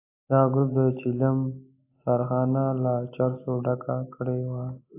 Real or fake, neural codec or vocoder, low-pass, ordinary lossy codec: real; none; 3.6 kHz; AAC, 24 kbps